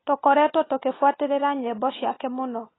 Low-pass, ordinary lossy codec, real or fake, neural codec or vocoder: 7.2 kHz; AAC, 16 kbps; real; none